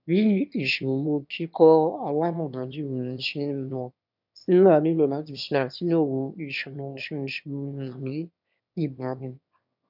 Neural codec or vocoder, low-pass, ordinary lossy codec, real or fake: autoencoder, 22.05 kHz, a latent of 192 numbers a frame, VITS, trained on one speaker; 5.4 kHz; none; fake